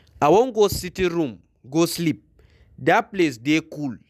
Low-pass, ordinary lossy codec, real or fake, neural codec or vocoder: 14.4 kHz; none; real; none